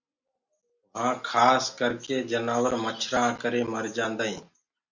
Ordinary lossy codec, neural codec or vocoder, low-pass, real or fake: Opus, 64 kbps; none; 7.2 kHz; real